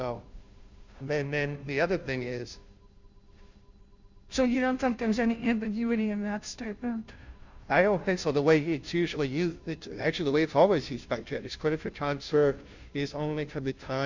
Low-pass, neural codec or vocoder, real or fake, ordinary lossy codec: 7.2 kHz; codec, 16 kHz, 0.5 kbps, FunCodec, trained on Chinese and English, 25 frames a second; fake; Opus, 64 kbps